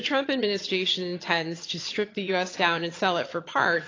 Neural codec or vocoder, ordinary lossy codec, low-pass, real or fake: vocoder, 22.05 kHz, 80 mel bands, HiFi-GAN; AAC, 32 kbps; 7.2 kHz; fake